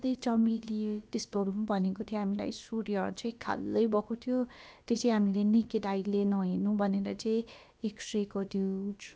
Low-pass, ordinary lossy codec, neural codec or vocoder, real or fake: none; none; codec, 16 kHz, about 1 kbps, DyCAST, with the encoder's durations; fake